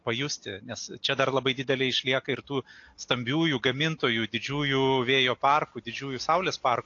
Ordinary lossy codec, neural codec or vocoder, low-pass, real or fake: AAC, 48 kbps; none; 7.2 kHz; real